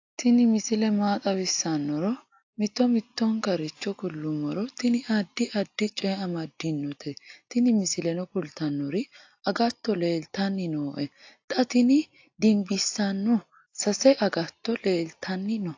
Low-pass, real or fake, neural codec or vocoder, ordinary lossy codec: 7.2 kHz; real; none; AAC, 48 kbps